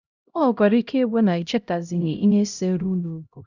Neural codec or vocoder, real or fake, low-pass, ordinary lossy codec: codec, 16 kHz, 0.5 kbps, X-Codec, HuBERT features, trained on LibriSpeech; fake; 7.2 kHz; none